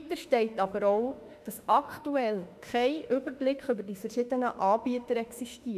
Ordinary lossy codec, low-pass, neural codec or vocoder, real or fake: none; 14.4 kHz; autoencoder, 48 kHz, 32 numbers a frame, DAC-VAE, trained on Japanese speech; fake